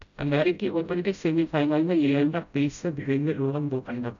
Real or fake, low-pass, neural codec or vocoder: fake; 7.2 kHz; codec, 16 kHz, 0.5 kbps, FreqCodec, smaller model